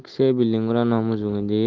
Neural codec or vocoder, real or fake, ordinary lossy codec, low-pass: none; real; Opus, 16 kbps; 7.2 kHz